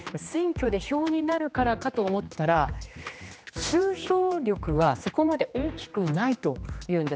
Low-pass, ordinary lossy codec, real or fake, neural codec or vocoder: none; none; fake; codec, 16 kHz, 2 kbps, X-Codec, HuBERT features, trained on general audio